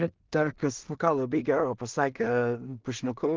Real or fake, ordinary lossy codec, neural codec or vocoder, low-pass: fake; Opus, 32 kbps; codec, 16 kHz in and 24 kHz out, 0.4 kbps, LongCat-Audio-Codec, two codebook decoder; 7.2 kHz